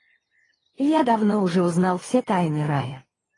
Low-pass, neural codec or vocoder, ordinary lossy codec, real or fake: 10.8 kHz; none; AAC, 32 kbps; real